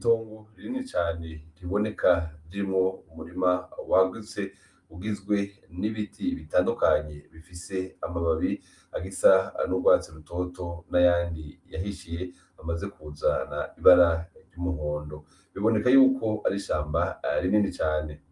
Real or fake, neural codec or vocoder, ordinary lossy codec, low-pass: real; none; Opus, 32 kbps; 10.8 kHz